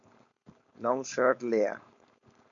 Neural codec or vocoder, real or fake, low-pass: codec, 16 kHz, 4.8 kbps, FACodec; fake; 7.2 kHz